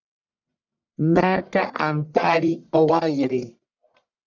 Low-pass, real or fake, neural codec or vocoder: 7.2 kHz; fake; codec, 44.1 kHz, 1.7 kbps, Pupu-Codec